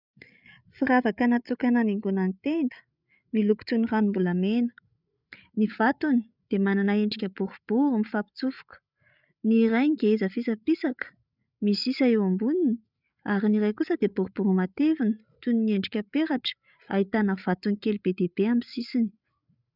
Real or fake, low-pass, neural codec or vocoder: fake; 5.4 kHz; codec, 16 kHz, 8 kbps, FreqCodec, larger model